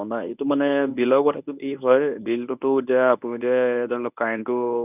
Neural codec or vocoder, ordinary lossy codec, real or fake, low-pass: codec, 24 kHz, 0.9 kbps, WavTokenizer, medium speech release version 1; none; fake; 3.6 kHz